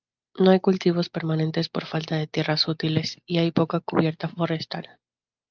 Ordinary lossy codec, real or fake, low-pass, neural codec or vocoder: Opus, 24 kbps; real; 7.2 kHz; none